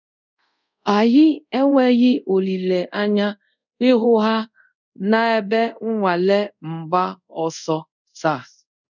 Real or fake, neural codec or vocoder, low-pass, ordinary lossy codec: fake; codec, 24 kHz, 0.5 kbps, DualCodec; 7.2 kHz; none